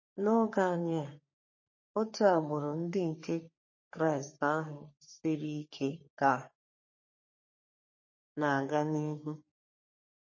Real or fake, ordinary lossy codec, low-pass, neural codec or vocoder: fake; MP3, 32 kbps; 7.2 kHz; codec, 44.1 kHz, 3.4 kbps, Pupu-Codec